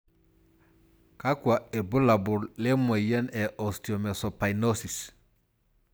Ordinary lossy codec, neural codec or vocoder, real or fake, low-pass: none; none; real; none